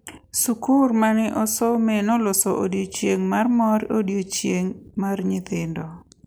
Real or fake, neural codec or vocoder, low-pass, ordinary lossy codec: real; none; none; none